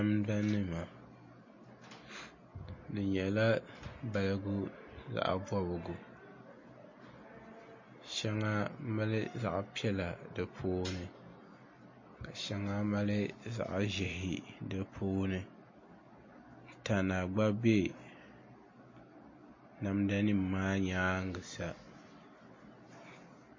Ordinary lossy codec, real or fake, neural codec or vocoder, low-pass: MP3, 32 kbps; real; none; 7.2 kHz